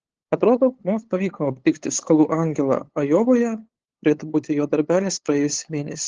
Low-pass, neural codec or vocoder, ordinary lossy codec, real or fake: 7.2 kHz; codec, 16 kHz, 8 kbps, FunCodec, trained on LibriTTS, 25 frames a second; Opus, 16 kbps; fake